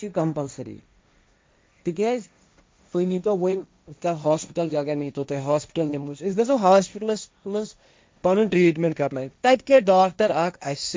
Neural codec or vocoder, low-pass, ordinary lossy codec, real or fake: codec, 16 kHz, 1.1 kbps, Voila-Tokenizer; none; none; fake